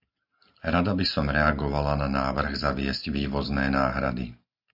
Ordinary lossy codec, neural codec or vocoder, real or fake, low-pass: AAC, 48 kbps; none; real; 5.4 kHz